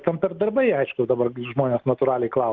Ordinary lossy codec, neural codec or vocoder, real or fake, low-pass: Opus, 32 kbps; none; real; 7.2 kHz